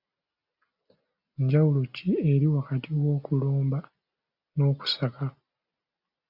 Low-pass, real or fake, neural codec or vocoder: 5.4 kHz; real; none